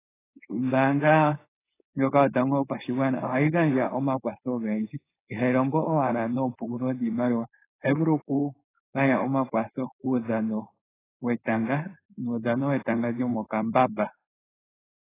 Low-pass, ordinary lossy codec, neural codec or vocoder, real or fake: 3.6 kHz; AAC, 16 kbps; codec, 16 kHz, 4.8 kbps, FACodec; fake